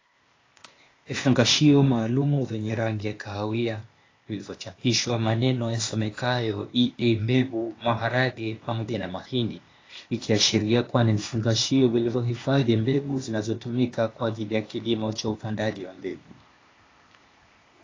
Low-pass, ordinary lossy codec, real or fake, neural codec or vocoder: 7.2 kHz; AAC, 32 kbps; fake; codec, 16 kHz, 0.8 kbps, ZipCodec